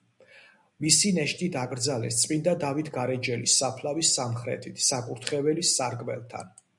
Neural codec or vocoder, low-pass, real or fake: none; 10.8 kHz; real